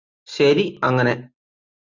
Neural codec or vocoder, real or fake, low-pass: none; real; 7.2 kHz